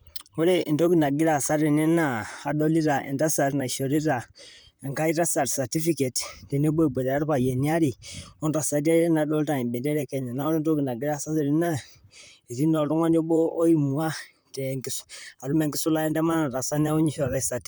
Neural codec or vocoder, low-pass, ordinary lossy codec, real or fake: vocoder, 44.1 kHz, 128 mel bands, Pupu-Vocoder; none; none; fake